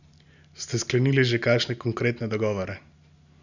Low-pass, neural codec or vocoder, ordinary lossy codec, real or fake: 7.2 kHz; none; none; real